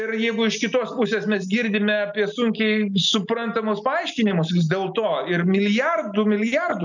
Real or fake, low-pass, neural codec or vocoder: real; 7.2 kHz; none